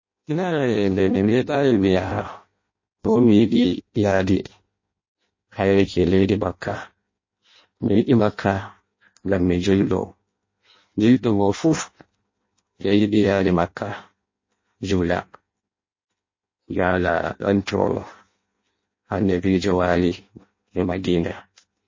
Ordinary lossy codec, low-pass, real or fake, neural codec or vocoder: MP3, 32 kbps; 7.2 kHz; fake; codec, 16 kHz in and 24 kHz out, 0.6 kbps, FireRedTTS-2 codec